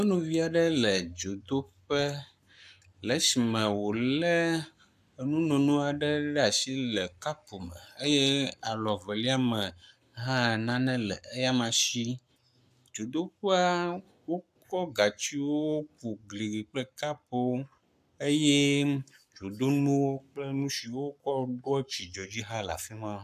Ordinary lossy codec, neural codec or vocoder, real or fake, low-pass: AAC, 96 kbps; codec, 44.1 kHz, 7.8 kbps, Pupu-Codec; fake; 14.4 kHz